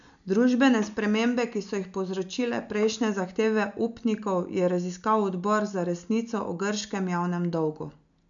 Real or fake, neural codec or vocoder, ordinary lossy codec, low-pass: real; none; none; 7.2 kHz